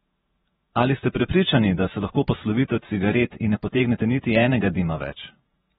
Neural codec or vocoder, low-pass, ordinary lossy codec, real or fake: vocoder, 48 kHz, 128 mel bands, Vocos; 19.8 kHz; AAC, 16 kbps; fake